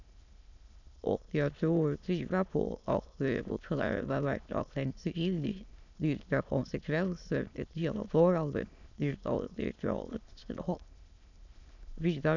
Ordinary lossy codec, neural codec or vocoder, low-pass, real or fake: none; autoencoder, 22.05 kHz, a latent of 192 numbers a frame, VITS, trained on many speakers; 7.2 kHz; fake